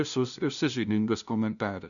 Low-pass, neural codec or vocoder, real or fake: 7.2 kHz; codec, 16 kHz, 0.5 kbps, FunCodec, trained on LibriTTS, 25 frames a second; fake